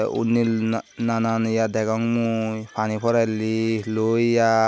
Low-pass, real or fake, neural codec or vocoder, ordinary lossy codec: none; real; none; none